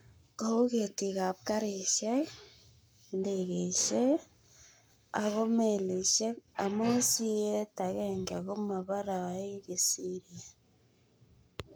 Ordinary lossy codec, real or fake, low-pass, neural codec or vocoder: none; fake; none; codec, 44.1 kHz, 7.8 kbps, Pupu-Codec